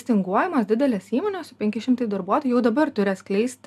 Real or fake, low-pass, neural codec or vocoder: real; 14.4 kHz; none